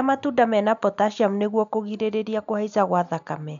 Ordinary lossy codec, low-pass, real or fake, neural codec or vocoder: none; 7.2 kHz; real; none